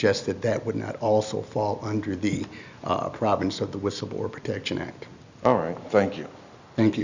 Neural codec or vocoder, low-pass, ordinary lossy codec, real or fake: none; 7.2 kHz; Opus, 64 kbps; real